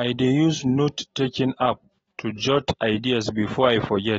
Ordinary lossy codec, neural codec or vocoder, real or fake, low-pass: AAC, 24 kbps; none; real; 19.8 kHz